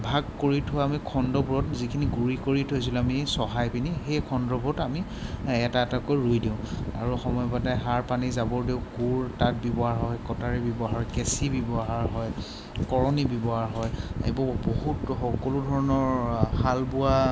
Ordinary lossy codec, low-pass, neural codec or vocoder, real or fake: none; none; none; real